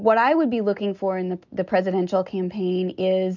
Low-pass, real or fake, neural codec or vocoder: 7.2 kHz; real; none